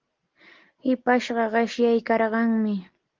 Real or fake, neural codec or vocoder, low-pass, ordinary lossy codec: real; none; 7.2 kHz; Opus, 16 kbps